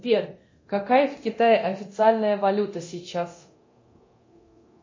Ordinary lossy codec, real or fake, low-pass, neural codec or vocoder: MP3, 32 kbps; fake; 7.2 kHz; codec, 24 kHz, 0.9 kbps, DualCodec